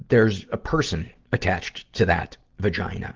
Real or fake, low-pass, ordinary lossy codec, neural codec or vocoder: real; 7.2 kHz; Opus, 16 kbps; none